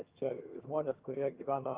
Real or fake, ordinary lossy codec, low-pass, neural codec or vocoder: fake; Opus, 32 kbps; 3.6 kHz; vocoder, 22.05 kHz, 80 mel bands, HiFi-GAN